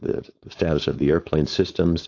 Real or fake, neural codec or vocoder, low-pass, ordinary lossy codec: fake; codec, 16 kHz, 4.8 kbps, FACodec; 7.2 kHz; AAC, 48 kbps